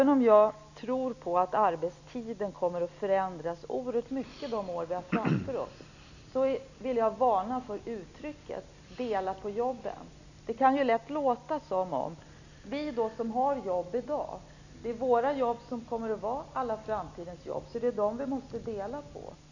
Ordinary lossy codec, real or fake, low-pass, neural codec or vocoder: none; real; 7.2 kHz; none